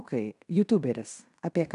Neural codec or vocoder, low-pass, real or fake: codec, 16 kHz in and 24 kHz out, 0.9 kbps, LongCat-Audio-Codec, fine tuned four codebook decoder; 10.8 kHz; fake